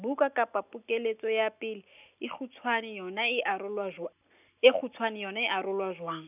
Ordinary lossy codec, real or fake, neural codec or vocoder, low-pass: none; real; none; 3.6 kHz